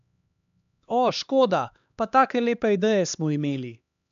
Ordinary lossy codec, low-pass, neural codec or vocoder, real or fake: none; 7.2 kHz; codec, 16 kHz, 2 kbps, X-Codec, HuBERT features, trained on LibriSpeech; fake